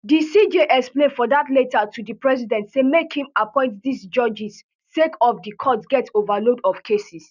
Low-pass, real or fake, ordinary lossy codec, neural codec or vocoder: 7.2 kHz; real; none; none